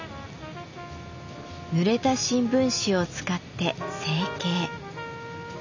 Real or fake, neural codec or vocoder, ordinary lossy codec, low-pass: real; none; none; 7.2 kHz